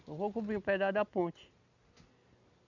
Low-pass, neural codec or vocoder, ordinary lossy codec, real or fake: 7.2 kHz; none; none; real